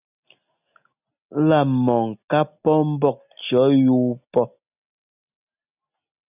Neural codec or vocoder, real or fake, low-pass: none; real; 3.6 kHz